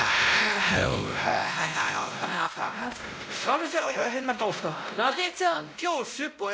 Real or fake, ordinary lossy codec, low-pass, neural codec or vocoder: fake; none; none; codec, 16 kHz, 0.5 kbps, X-Codec, WavLM features, trained on Multilingual LibriSpeech